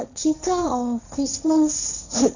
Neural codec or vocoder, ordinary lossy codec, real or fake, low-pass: codec, 16 kHz, 1.1 kbps, Voila-Tokenizer; none; fake; 7.2 kHz